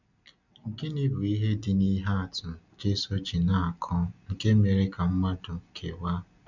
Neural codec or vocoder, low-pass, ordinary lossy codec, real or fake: none; 7.2 kHz; none; real